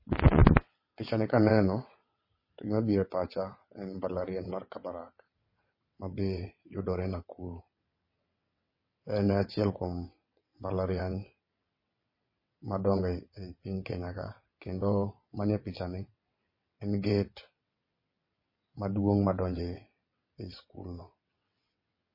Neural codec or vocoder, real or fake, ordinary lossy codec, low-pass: vocoder, 22.05 kHz, 80 mel bands, WaveNeXt; fake; MP3, 24 kbps; 5.4 kHz